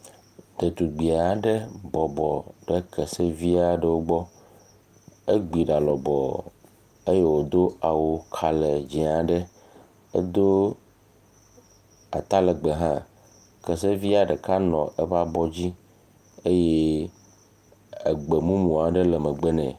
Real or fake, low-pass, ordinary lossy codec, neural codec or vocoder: real; 14.4 kHz; Opus, 32 kbps; none